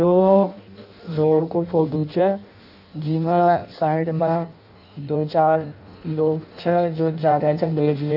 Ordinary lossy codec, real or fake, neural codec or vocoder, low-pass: none; fake; codec, 16 kHz in and 24 kHz out, 0.6 kbps, FireRedTTS-2 codec; 5.4 kHz